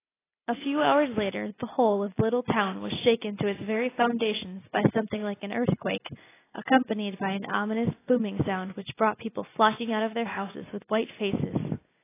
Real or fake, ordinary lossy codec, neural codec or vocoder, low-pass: real; AAC, 16 kbps; none; 3.6 kHz